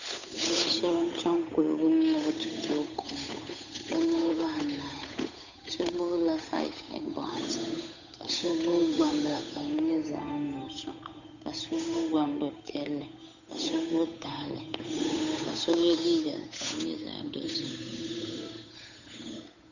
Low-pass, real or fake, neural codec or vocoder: 7.2 kHz; fake; codec, 16 kHz, 8 kbps, FunCodec, trained on Chinese and English, 25 frames a second